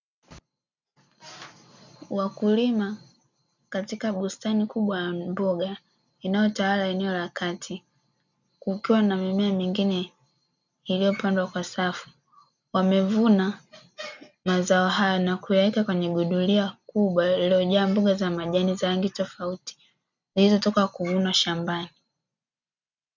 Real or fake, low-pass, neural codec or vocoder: real; 7.2 kHz; none